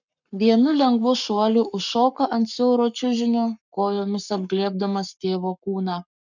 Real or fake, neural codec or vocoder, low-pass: fake; codec, 44.1 kHz, 7.8 kbps, Pupu-Codec; 7.2 kHz